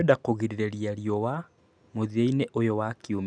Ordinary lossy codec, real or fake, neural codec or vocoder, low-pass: none; real; none; 9.9 kHz